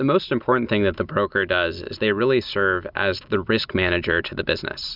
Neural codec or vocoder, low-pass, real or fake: none; 5.4 kHz; real